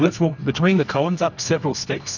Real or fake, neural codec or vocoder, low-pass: fake; codec, 24 kHz, 0.9 kbps, WavTokenizer, medium music audio release; 7.2 kHz